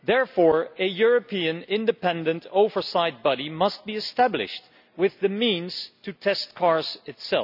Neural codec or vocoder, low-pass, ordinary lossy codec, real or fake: none; 5.4 kHz; none; real